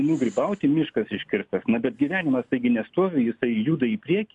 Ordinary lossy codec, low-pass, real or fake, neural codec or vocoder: MP3, 96 kbps; 10.8 kHz; real; none